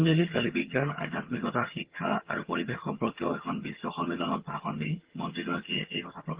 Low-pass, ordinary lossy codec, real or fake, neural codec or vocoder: 3.6 kHz; Opus, 16 kbps; fake; vocoder, 22.05 kHz, 80 mel bands, HiFi-GAN